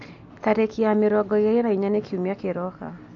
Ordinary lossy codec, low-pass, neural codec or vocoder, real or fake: AAC, 48 kbps; 7.2 kHz; none; real